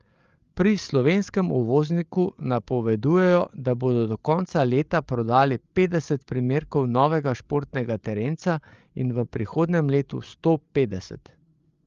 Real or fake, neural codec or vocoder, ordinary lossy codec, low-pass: fake; codec, 16 kHz, 8 kbps, FreqCodec, larger model; Opus, 32 kbps; 7.2 kHz